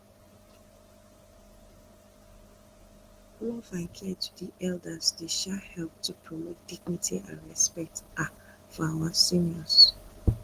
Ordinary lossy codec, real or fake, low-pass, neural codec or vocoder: Opus, 16 kbps; real; 14.4 kHz; none